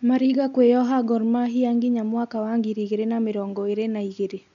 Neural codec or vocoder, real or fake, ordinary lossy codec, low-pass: none; real; none; 7.2 kHz